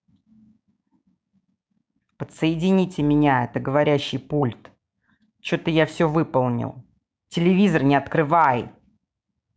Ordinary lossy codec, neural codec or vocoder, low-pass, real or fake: none; codec, 16 kHz, 6 kbps, DAC; none; fake